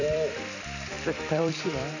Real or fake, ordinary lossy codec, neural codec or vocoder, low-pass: fake; none; vocoder, 44.1 kHz, 128 mel bands every 256 samples, BigVGAN v2; 7.2 kHz